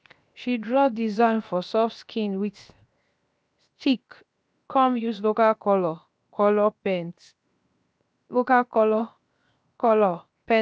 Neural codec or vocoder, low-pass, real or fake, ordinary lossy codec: codec, 16 kHz, 0.7 kbps, FocalCodec; none; fake; none